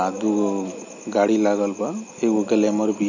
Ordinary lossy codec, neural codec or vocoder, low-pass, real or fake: none; none; 7.2 kHz; real